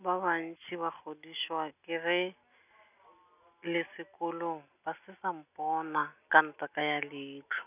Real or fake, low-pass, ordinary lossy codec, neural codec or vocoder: real; 3.6 kHz; none; none